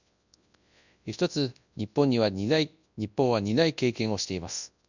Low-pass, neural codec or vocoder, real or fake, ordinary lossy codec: 7.2 kHz; codec, 24 kHz, 0.9 kbps, WavTokenizer, large speech release; fake; none